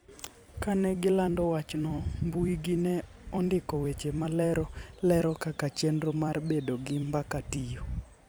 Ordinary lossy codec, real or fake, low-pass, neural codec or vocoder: none; fake; none; vocoder, 44.1 kHz, 128 mel bands every 256 samples, BigVGAN v2